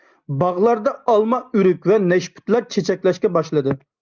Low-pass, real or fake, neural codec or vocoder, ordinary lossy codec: 7.2 kHz; real; none; Opus, 24 kbps